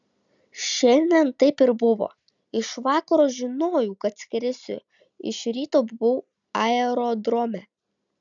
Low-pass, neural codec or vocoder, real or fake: 7.2 kHz; none; real